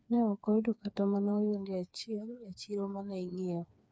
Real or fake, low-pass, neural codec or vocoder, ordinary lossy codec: fake; none; codec, 16 kHz, 4 kbps, FreqCodec, smaller model; none